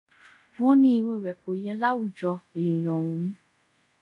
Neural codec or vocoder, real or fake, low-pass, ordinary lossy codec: codec, 24 kHz, 0.5 kbps, DualCodec; fake; 10.8 kHz; none